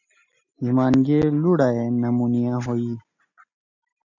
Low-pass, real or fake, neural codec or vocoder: 7.2 kHz; real; none